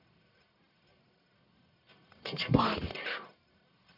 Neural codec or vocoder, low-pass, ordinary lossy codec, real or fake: codec, 44.1 kHz, 1.7 kbps, Pupu-Codec; 5.4 kHz; none; fake